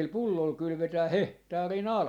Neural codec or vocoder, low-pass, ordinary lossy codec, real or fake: none; 19.8 kHz; none; real